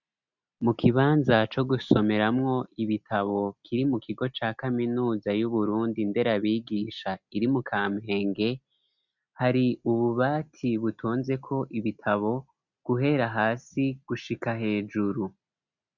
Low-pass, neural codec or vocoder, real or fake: 7.2 kHz; none; real